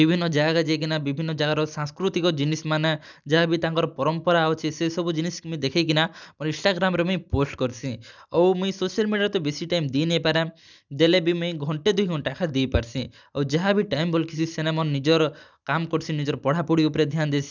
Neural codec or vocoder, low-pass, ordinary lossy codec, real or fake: none; 7.2 kHz; none; real